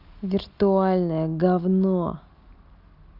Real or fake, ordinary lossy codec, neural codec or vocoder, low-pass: real; Opus, 24 kbps; none; 5.4 kHz